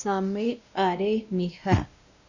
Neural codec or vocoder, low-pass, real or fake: codec, 16 kHz, 1 kbps, X-Codec, WavLM features, trained on Multilingual LibriSpeech; 7.2 kHz; fake